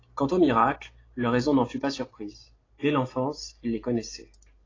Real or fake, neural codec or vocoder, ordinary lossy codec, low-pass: real; none; AAC, 32 kbps; 7.2 kHz